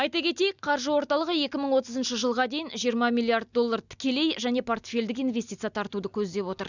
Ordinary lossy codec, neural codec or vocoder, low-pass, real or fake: none; none; 7.2 kHz; real